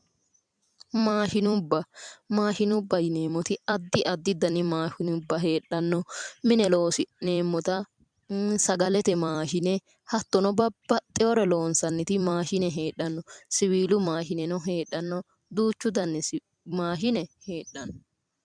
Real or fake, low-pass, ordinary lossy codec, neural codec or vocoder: fake; 9.9 kHz; MP3, 96 kbps; vocoder, 44.1 kHz, 128 mel bands every 256 samples, BigVGAN v2